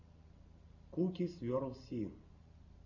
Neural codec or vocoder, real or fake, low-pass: none; real; 7.2 kHz